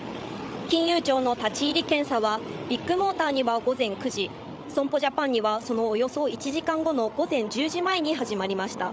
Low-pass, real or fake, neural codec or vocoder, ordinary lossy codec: none; fake; codec, 16 kHz, 8 kbps, FreqCodec, larger model; none